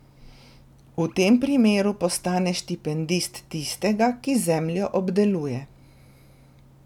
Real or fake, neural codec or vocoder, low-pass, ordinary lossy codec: real; none; 19.8 kHz; none